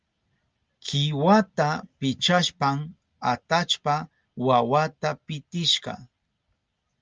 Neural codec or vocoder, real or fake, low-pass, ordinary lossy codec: none; real; 7.2 kHz; Opus, 24 kbps